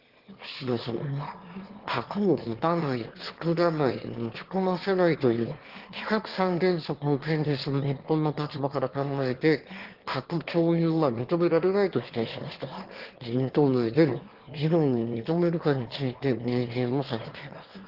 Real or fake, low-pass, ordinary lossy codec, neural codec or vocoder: fake; 5.4 kHz; Opus, 16 kbps; autoencoder, 22.05 kHz, a latent of 192 numbers a frame, VITS, trained on one speaker